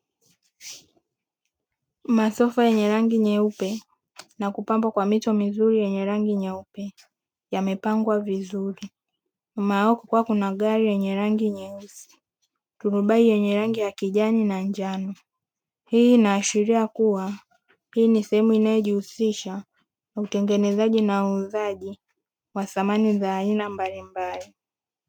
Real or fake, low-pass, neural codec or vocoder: real; 19.8 kHz; none